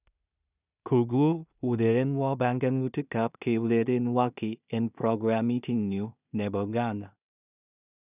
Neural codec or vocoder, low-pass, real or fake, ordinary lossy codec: codec, 16 kHz in and 24 kHz out, 0.4 kbps, LongCat-Audio-Codec, two codebook decoder; 3.6 kHz; fake; none